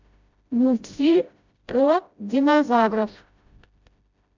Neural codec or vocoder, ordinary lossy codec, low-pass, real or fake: codec, 16 kHz, 0.5 kbps, FreqCodec, smaller model; MP3, 64 kbps; 7.2 kHz; fake